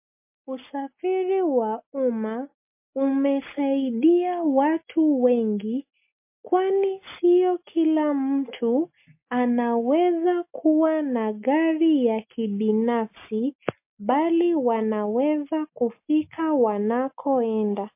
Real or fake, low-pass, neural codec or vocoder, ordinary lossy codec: real; 3.6 kHz; none; MP3, 24 kbps